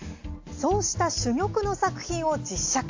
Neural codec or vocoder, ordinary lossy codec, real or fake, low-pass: none; AAC, 48 kbps; real; 7.2 kHz